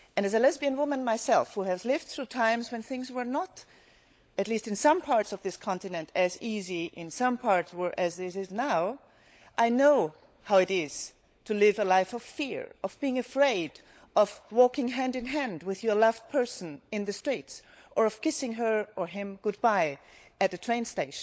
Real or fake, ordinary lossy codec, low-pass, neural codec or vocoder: fake; none; none; codec, 16 kHz, 16 kbps, FunCodec, trained on LibriTTS, 50 frames a second